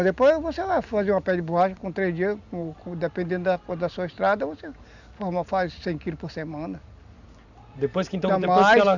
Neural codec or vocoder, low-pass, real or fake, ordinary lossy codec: none; 7.2 kHz; real; none